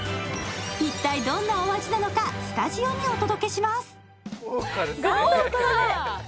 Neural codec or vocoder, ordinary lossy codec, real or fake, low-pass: none; none; real; none